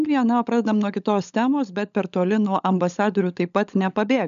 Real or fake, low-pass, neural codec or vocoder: fake; 7.2 kHz; codec, 16 kHz, 16 kbps, FunCodec, trained on LibriTTS, 50 frames a second